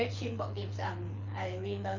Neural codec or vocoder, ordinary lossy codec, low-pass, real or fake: codec, 24 kHz, 6 kbps, HILCodec; MP3, 64 kbps; 7.2 kHz; fake